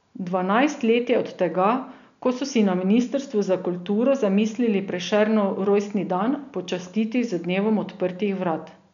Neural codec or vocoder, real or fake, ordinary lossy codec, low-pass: none; real; none; 7.2 kHz